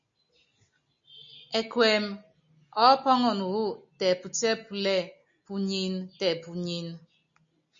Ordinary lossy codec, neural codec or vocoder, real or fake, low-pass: MP3, 48 kbps; none; real; 7.2 kHz